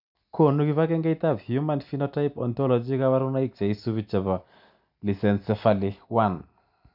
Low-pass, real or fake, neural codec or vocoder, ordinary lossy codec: 5.4 kHz; real; none; none